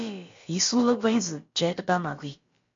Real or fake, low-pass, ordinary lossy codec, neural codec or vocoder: fake; 7.2 kHz; MP3, 48 kbps; codec, 16 kHz, about 1 kbps, DyCAST, with the encoder's durations